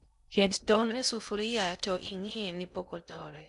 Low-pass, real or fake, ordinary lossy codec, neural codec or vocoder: 10.8 kHz; fake; none; codec, 16 kHz in and 24 kHz out, 0.6 kbps, FocalCodec, streaming, 2048 codes